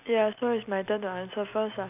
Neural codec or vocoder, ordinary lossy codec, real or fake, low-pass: none; none; real; 3.6 kHz